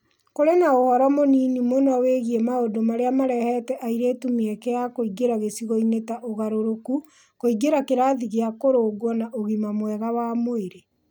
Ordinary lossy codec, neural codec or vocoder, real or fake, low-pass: none; none; real; none